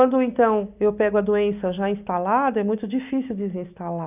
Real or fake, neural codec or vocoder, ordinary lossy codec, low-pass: real; none; none; 3.6 kHz